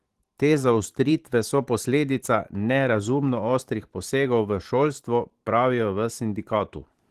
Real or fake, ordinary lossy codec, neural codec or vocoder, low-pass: fake; Opus, 16 kbps; vocoder, 44.1 kHz, 128 mel bands every 512 samples, BigVGAN v2; 14.4 kHz